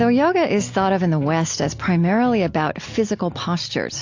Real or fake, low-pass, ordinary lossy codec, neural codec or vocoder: real; 7.2 kHz; AAC, 48 kbps; none